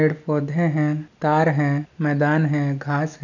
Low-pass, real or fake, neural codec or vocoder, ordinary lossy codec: 7.2 kHz; real; none; none